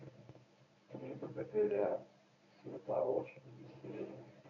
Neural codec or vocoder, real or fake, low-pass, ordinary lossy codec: codec, 24 kHz, 0.9 kbps, WavTokenizer, medium speech release version 1; fake; 7.2 kHz; none